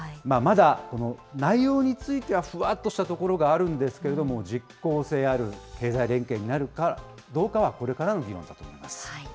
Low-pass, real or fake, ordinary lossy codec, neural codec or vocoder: none; real; none; none